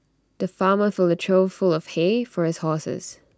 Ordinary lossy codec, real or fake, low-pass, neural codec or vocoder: none; real; none; none